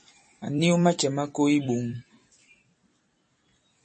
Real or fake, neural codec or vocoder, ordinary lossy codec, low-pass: fake; vocoder, 44.1 kHz, 128 mel bands every 256 samples, BigVGAN v2; MP3, 32 kbps; 10.8 kHz